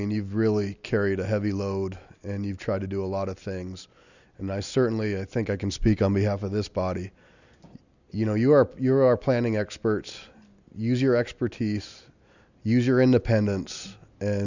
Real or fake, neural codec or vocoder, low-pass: real; none; 7.2 kHz